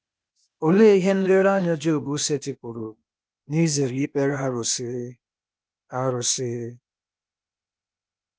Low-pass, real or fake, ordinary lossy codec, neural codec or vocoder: none; fake; none; codec, 16 kHz, 0.8 kbps, ZipCodec